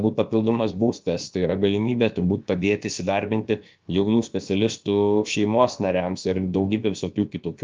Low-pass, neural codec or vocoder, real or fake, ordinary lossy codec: 7.2 kHz; codec, 16 kHz, about 1 kbps, DyCAST, with the encoder's durations; fake; Opus, 24 kbps